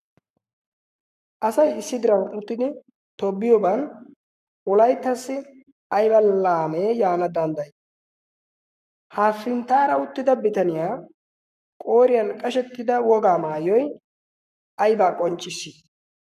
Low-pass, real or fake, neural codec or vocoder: 14.4 kHz; fake; codec, 44.1 kHz, 7.8 kbps, Pupu-Codec